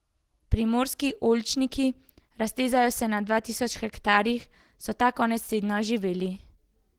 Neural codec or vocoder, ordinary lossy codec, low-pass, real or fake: none; Opus, 16 kbps; 19.8 kHz; real